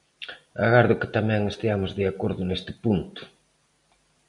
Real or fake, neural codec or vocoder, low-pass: real; none; 10.8 kHz